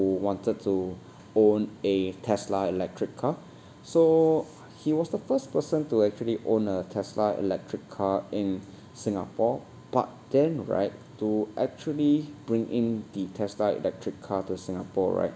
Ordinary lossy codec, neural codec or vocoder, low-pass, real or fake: none; none; none; real